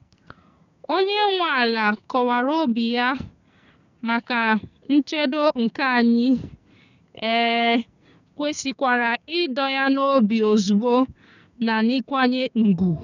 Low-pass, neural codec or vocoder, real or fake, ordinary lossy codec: 7.2 kHz; codec, 44.1 kHz, 2.6 kbps, SNAC; fake; none